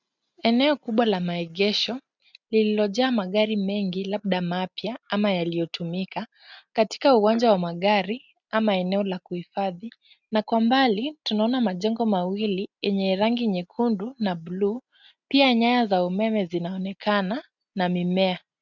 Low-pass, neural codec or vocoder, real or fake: 7.2 kHz; none; real